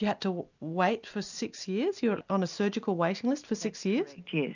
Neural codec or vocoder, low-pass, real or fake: none; 7.2 kHz; real